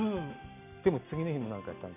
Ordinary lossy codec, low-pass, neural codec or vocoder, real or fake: none; 3.6 kHz; none; real